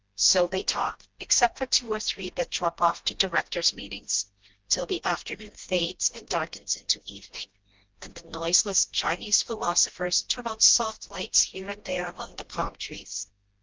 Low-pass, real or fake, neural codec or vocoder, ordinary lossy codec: 7.2 kHz; fake; codec, 16 kHz, 1 kbps, FreqCodec, smaller model; Opus, 24 kbps